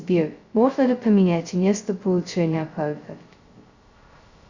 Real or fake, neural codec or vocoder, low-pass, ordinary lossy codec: fake; codec, 16 kHz, 0.2 kbps, FocalCodec; 7.2 kHz; Opus, 64 kbps